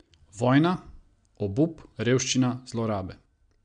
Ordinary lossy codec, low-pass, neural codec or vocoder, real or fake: MP3, 64 kbps; 9.9 kHz; none; real